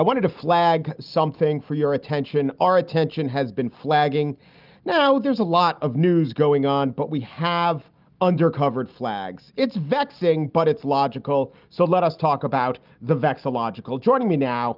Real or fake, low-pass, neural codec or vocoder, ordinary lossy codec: real; 5.4 kHz; none; Opus, 32 kbps